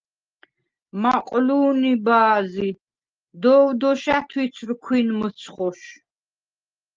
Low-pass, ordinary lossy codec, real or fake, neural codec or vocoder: 7.2 kHz; Opus, 24 kbps; real; none